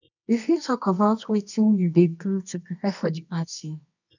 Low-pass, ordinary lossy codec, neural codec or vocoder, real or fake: 7.2 kHz; none; codec, 24 kHz, 0.9 kbps, WavTokenizer, medium music audio release; fake